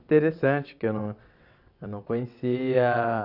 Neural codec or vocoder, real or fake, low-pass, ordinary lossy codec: vocoder, 22.05 kHz, 80 mel bands, WaveNeXt; fake; 5.4 kHz; none